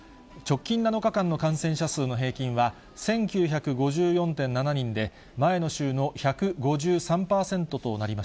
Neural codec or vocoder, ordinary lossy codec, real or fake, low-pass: none; none; real; none